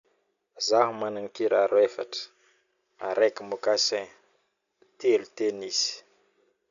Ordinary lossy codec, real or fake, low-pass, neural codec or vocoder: none; real; 7.2 kHz; none